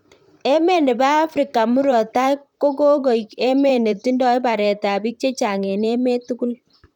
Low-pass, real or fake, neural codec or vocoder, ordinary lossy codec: 19.8 kHz; fake; vocoder, 44.1 kHz, 128 mel bands, Pupu-Vocoder; none